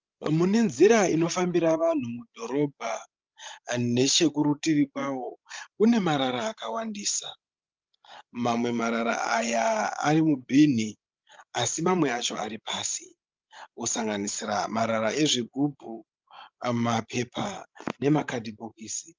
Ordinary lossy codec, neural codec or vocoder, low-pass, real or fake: Opus, 24 kbps; codec, 16 kHz, 16 kbps, FreqCodec, larger model; 7.2 kHz; fake